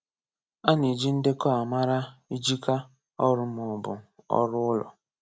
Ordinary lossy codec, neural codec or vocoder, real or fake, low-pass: none; none; real; none